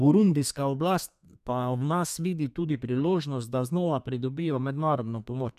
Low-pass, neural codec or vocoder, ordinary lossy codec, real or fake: 14.4 kHz; codec, 32 kHz, 1.9 kbps, SNAC; none; fake